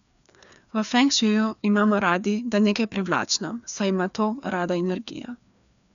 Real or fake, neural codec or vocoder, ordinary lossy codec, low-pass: fake; codec, 16 kHz, 2 kbps, FreqCodec, larger model; none; 7.2 kHz